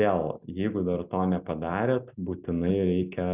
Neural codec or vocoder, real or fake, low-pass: none; real; 3.6 kHz